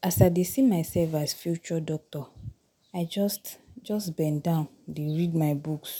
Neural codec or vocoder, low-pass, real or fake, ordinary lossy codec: none; none; real; none